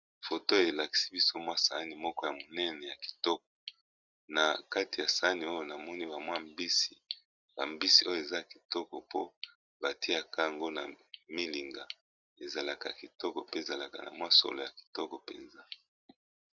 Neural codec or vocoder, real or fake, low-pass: none; real; 7.2 kHz